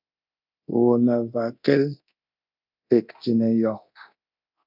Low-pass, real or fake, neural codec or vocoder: 5.4 kHz; fake; codec, 24 kHz, 0.9 kbps, DualCodec